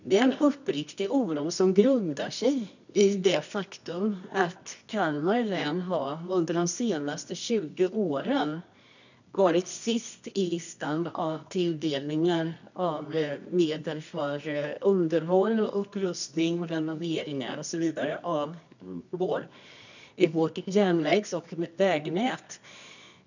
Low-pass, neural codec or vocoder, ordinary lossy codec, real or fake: 7.2 kHz; codec, 24 kHz, 0.9 kbps, WavTokenizer, medium music audio release; none; fake